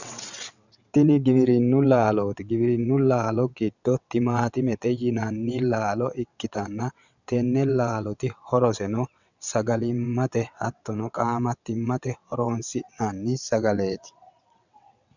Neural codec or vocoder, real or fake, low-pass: vocoder, 22.05 kHz, 80 mel bands, WaveNeXt; fake; 7.2 kHz